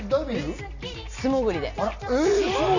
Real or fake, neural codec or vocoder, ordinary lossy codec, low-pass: real; none; none; 7.2 kHz